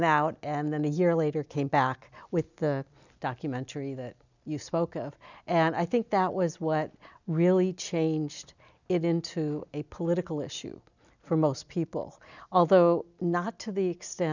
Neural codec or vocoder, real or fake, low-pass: none; real; 7.2 kHz